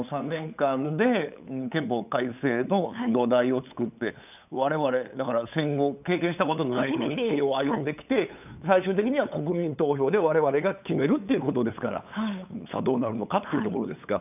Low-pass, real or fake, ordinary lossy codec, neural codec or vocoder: 3.6 kHz; fake; none; codec, 16 kHz, 8 kbps, FunCodec, trained on LibriTTS, 25 frames a second